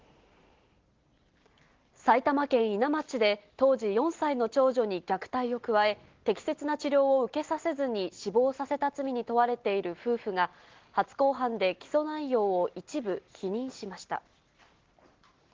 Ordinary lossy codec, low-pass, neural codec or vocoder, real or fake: Opus, 16 kbps; 7.2 kHz; none; real